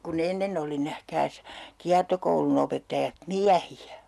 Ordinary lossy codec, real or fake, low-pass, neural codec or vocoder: none; real; none; none